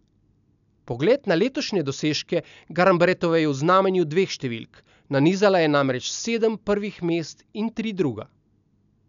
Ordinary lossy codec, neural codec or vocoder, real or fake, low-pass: none; none; real; 7.2 kHz